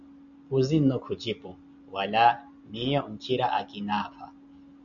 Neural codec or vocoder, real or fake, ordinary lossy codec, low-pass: none; real; MP3, 64 kbps; 7.2 kHz